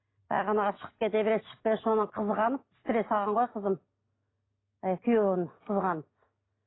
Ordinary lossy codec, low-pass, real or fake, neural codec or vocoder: AAC, 16 kbps; 7.2 kHz; real; none